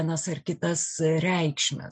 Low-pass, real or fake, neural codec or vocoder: 9.9 kHz; real; none